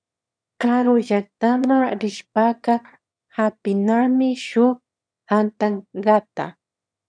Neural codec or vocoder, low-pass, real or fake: autoencoder, 22.05 kHz, a latent of 192 numbers a frame, VITS, trained on one speaker; 9.9 kHz; fake